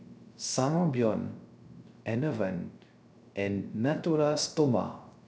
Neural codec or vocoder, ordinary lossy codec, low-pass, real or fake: codec, 16 kHz, 0.3 kbps, FocalCodec; none; none; fake